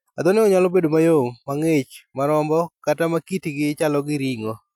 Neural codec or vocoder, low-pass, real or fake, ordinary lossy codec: none; 19.8 kHz; real; none